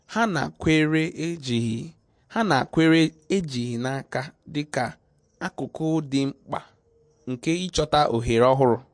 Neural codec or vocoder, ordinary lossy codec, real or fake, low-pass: none; MP3, 48 kbps; real; 9.9 kHz